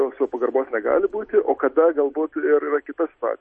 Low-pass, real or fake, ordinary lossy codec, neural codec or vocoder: 10.8 kHz; real; MP3, 32 kbps; none